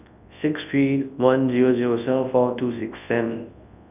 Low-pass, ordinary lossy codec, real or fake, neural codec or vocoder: 3.6 kHz; none; fake; codec, 24 kHz, 0.9 kbps, WavTokenizer, large speech release